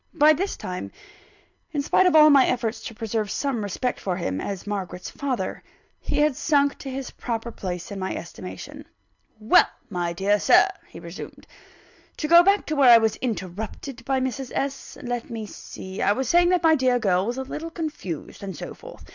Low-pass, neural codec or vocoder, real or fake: 7.2 kHz; none; real